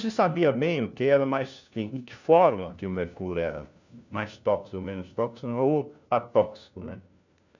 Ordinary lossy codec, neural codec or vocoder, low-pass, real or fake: none; codec, 16 kHz, 1 kbps, FunCodec, trained on LibriTTS, 50 frames a second; 7.2 kHz; fake